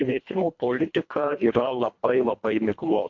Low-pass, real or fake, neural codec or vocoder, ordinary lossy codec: 7.2 kHz; fake; codec, 24 kHz, 1.5 kbps, HILCodec; MP3, 48 kbps